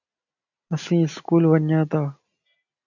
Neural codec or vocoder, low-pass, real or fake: none; 7.2 kHz; real